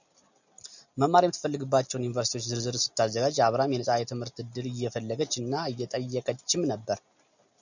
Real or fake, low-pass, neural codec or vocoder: real; 7.2 kHz; none